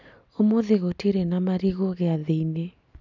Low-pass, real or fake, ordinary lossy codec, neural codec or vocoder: 7.2 kHz; real; none; none